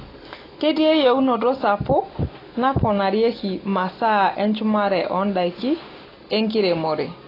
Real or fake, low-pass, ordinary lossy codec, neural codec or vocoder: real; 5.4 kHz; AAC, 24 kbps; none